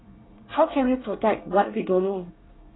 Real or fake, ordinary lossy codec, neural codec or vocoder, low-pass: fake; AAC, 16 kbps; codec, 24 kHz, 1 kbps, SNAC; 7.2 kHz